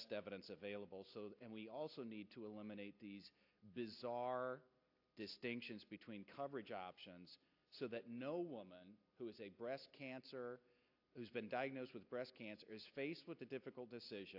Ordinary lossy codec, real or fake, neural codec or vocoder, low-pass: AAC, 48 kbps; real; none; 5.4 kHz